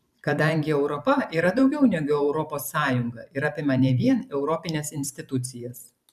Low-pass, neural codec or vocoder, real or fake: 14.4 kHz; vocoder, 44.1 kHz, 128 mel bands every 512 samples, BigVGAN v2; fake